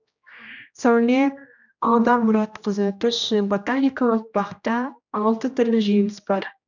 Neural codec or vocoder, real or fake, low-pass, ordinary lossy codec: codec, 16 kHz, 1 kbps, X-Codec, HuBERT features, trained on general audio; fake; 7.2 kHz; none